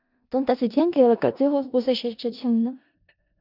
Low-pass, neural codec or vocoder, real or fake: 5.4 kHz; codec, 16 kHz in and 24 kHz out, 0.4 kbps, LongCat-Audio-Codec, four codebook decoder; fake